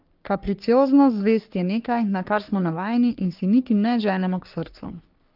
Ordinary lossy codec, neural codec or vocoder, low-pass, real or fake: Opus, 32 kbps; codec, 44.1 kHz, 3.4 kbps, Pupu-Codec; 5.4 kHz; fake